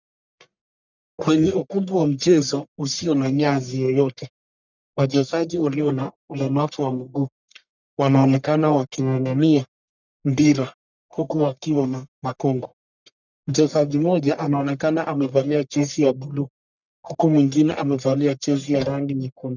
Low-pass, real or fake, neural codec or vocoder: 7.2 kHz; fake; codec, 44.1 kHz, 1.7 kbps, Pupu-Codec